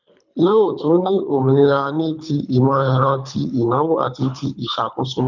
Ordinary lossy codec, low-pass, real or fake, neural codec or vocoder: none; 7.2 kHz; fake; codec, 24 kHz, 3 kbps, HILCodec